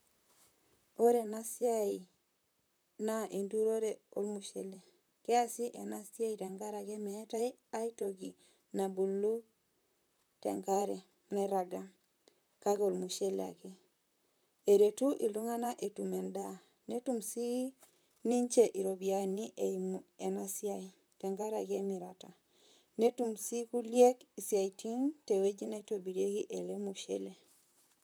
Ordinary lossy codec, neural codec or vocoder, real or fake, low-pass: none; vocoder, 44.1 kHz, 128 mel bands, Pupu-Vocoder; fake; none